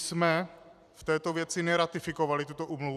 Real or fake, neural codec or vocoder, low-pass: fake; vocoder, 44.1 kHz, 128 mel bands every 256 samples, BigVGAN v2; 14.4 kHz